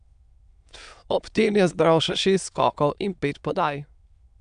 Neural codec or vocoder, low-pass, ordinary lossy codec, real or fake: autoencoder, 22.05 kHz, a latent of 192 numbers a frame, VITS, trained on many speakers; 9.9 kHz; MP3, 96 kbps; fake